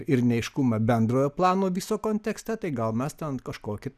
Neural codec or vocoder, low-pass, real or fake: none; 14.4 kHz; real